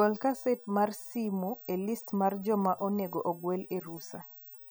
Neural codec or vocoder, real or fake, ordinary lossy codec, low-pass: none; real; none; none